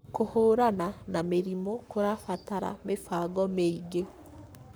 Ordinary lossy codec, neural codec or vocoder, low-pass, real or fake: none; codec, 44.1 kHz, 7.8 kbps, Pupu-Codec; none; fake